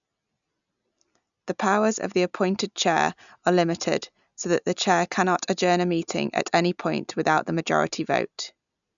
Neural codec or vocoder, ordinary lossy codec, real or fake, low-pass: none; none; real; 7.2 kHz